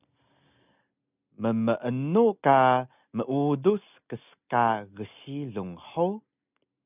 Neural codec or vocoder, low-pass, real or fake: none; 3.6 kHz; real